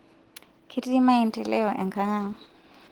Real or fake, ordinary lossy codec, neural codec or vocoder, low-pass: real; Opus, 16 kbps; none; 19.8 kHz